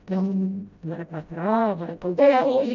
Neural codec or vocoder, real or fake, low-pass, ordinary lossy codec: codec, 16 kHz, 0.5 kbps, FreqCodec, smaller model; fake; 7.2 kHz; none